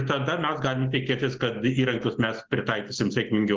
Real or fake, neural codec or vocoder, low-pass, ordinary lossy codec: real; none; 7.2 kHz; Opus, 16 kbps